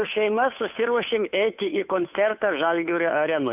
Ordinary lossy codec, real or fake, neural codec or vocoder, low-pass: AAC, 32 kbps; fake; codec, 16 kHz, 2 kbps, FunCodec, trained on Chinese and English, 25 frames a second; 3.6 kHz